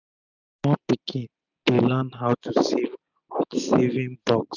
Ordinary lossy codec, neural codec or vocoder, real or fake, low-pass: none; none; real; 7.2 kHz